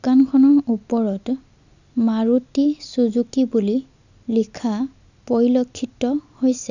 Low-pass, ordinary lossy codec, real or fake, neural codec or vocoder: 7.2 kHz; none; real; none